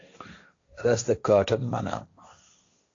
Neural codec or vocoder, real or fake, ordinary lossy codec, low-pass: codec, 16 kHz, 1.1 kbps, Voila-Tokenizer; fake; AAC, 48 kbps; 7.2 kHz